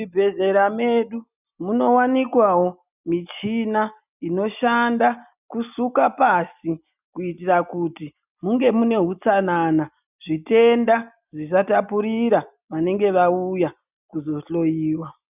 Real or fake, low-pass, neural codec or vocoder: real; 3.6 kHz; none